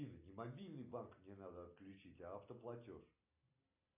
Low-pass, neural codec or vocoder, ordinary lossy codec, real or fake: 3.6 kHz; none; AAC, 32 kbps; real